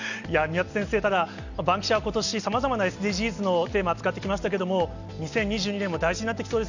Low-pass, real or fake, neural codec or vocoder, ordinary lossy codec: 7.2 kHz; real; none; none